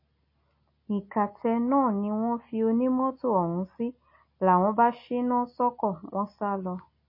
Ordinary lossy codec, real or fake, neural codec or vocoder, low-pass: MP3, 24 kbps; real; none; 5.4 kHz